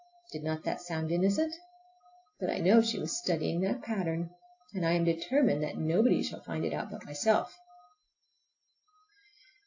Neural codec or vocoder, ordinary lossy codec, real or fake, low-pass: none; AAC, 48 kbps; real; 7.2 kHz